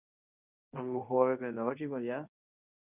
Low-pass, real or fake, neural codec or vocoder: 3.6 kHz; fake; codec, 24 kHz, 0.9 kbps, WavTokenizer, medium speech release version 1